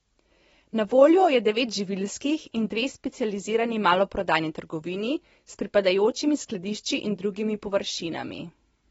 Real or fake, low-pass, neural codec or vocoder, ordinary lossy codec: real; 19.8 kHz; none; AAC, 24 kbps